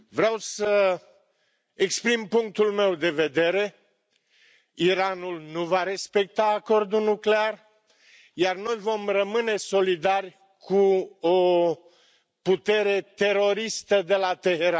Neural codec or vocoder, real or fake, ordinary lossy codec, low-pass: none; real; none; none